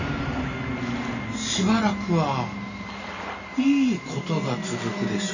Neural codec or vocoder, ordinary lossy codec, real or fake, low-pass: none; none; real; 7.2 kHz